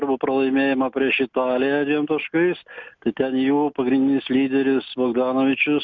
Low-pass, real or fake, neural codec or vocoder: 7.2 kHz; real; none